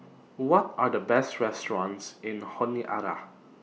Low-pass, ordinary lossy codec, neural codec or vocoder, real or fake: none; none; none; real